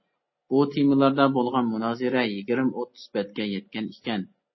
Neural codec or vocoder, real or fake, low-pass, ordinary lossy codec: none; real; 7.2 kHz; MP3, 24 kbps